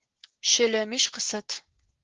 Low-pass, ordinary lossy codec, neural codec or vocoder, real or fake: 7.2 kHz; Opus, 16 kbps; codec, 16 kHz, 6 kbps, DAC; fake